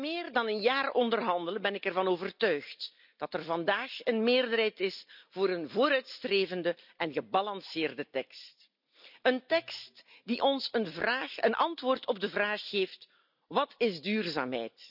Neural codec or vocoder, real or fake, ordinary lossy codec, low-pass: none; real; none; 5.4 kHz